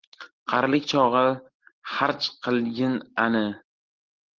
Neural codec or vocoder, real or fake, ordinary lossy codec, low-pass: none; real; Opus, 16 kbps; 7.2 kHz